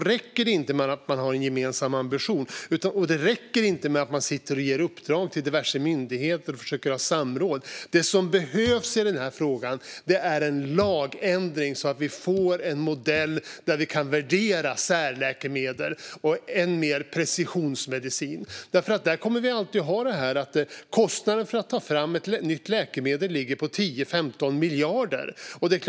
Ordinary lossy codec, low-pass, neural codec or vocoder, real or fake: none; none; none; real